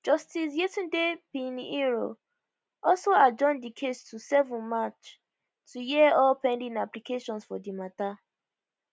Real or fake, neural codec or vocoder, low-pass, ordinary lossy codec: real; none; none; none